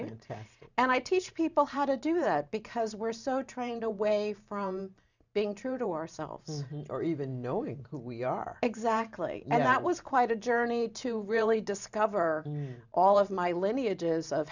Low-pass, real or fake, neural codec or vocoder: 7.2 kHz; fake; vocoder, 44.1 kHz, 128 mel bands every 512 samples, BigVGAN v2